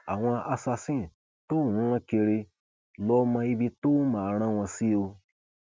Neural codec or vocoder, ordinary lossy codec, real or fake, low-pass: none; none; real; none